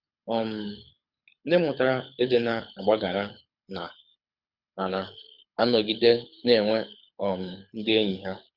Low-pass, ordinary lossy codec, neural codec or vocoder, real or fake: 5.4 kHz; Opus, 64 kbps; codec, 24 kHz, 6 kbps, HILCodec; fake